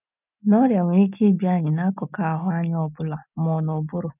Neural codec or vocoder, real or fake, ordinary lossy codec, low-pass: none; real; none; 3.6 kHz